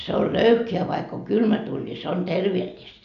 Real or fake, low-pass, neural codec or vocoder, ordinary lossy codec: real; 7.2 kHz; none; none